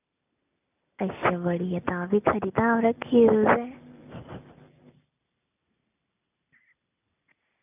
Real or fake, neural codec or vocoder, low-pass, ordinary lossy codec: real; none; 3.6 kHz; none